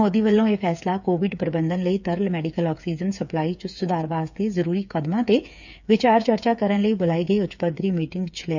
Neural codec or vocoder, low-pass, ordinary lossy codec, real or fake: codec, 16 kHz, 8 kbps, FreqCodec, smaller model; 7.2 kHz; none; fake